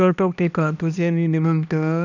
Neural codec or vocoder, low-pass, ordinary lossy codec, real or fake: codec, 16 kHz, 1 kbps, X-Codec, HuBERT features, trained on balanced general audio; 7.2 kHz; none; fake